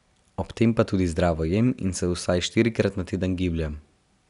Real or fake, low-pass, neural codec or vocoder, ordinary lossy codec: real; 10.8 kHz; none; none